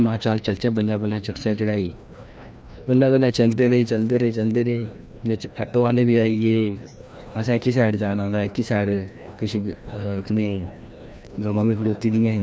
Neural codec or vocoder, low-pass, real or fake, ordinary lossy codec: codec, 16 kHz, 1 kbps, FreqCodec, larger model; none; fake; none